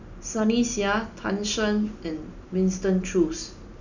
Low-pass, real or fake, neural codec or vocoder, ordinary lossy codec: 7.2 kHz; real; none; none